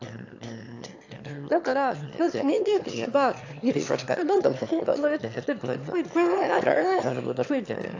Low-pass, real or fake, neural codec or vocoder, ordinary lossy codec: 7.2 kHz; fake; autoencoder, 22.05 kHz, a latent of 192 numbers a frame, VITS, trained on one speaker; AAC, 48 kbps